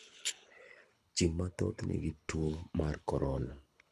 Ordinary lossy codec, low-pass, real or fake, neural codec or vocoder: none; none; fake; codec, 24 kHz, 6 kbps, HILCodec